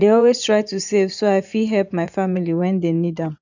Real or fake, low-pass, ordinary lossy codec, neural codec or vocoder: fake; 7.2 kHz; none; vocoder, 24 kHz, 100 mel bands, Vocos